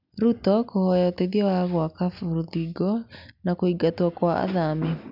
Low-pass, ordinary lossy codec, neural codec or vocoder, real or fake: 5.4 kHz; none; none; real